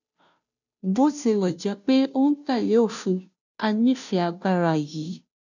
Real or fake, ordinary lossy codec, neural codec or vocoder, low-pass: fake; none; codec, 16 kHz, 0.5 kbps, FunCodec, trained on Chinese and English, 25 frames a second; 7.2 kHz